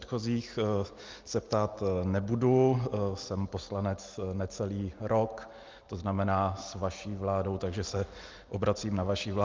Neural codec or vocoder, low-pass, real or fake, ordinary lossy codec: none; 7.2 kHz; real; Opus, 32 kbps